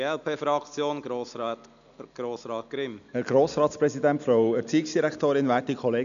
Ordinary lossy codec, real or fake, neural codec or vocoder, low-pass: none; real; none; 7.2 kHz